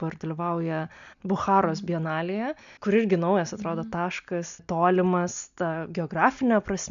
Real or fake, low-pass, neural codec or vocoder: real; 7.2 kHz; none